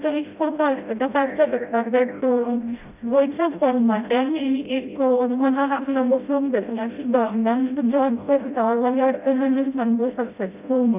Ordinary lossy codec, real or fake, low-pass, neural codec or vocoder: none; fake; 3.6 kHz; codec, 16 kHz, 0.5 kbps, FreqCodec, smaller model